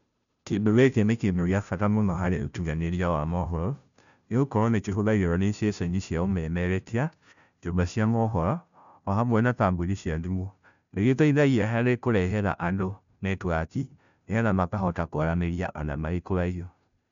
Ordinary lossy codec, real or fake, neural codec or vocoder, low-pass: MP3, 96 kbps; fake; codec, 16 kHz, 0.5 kbps, FunCodec, trained on Chinese and English, 25 frames a second; 7.2 kHz